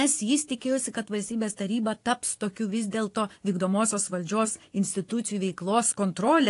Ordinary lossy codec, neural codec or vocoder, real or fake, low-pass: AAC, 48 kbps; vocoder, 24 kHz, 100 mel bands, Vocos; fake; 10.8 kHz